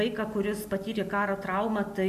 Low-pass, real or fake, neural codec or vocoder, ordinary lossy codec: 14.4 kHz; real; none; MP3, 64 kbps